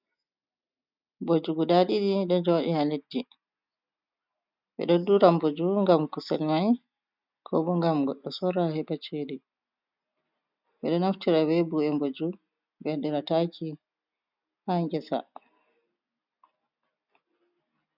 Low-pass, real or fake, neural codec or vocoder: 5.4 kHz; real; none